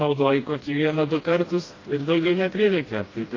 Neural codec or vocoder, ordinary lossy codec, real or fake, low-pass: codec, 16 kHz, 1 kbps, FreqCodec, smaller model; AAC, 32 kbps; fake; 7.2 kHz